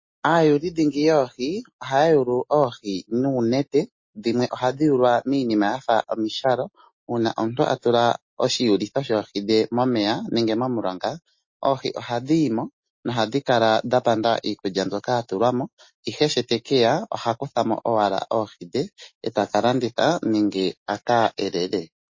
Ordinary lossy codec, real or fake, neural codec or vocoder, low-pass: MP3, 32 kbps; real; none; 7.2 kHz